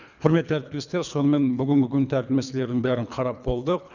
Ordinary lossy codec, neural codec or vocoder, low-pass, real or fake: none; codec, 24 kHz, 3 kbps, HILCodec; 7.2 kHz; fake